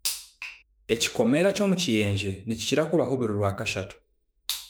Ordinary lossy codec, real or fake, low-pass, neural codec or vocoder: none; fake; none; autoencoder, 48 kHz, 32 numbers a frame, DAC-VAE, trained on Japanese speech